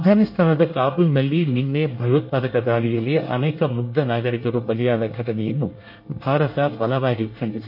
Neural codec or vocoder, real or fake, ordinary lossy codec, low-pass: codec, 24 kHz, 1 kbps, SNAC; fake; MP3, 32 kbps; 5.4 kHz